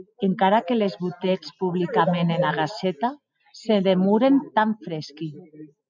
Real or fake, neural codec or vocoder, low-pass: real; none; 7.2 kHz